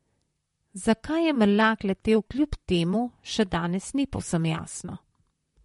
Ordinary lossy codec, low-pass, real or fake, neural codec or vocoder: MP3, 48 kbps; 19.8 kHz; fake; vocoder, 44.1 kHz, 128 mel bands, Pupu-Vocoder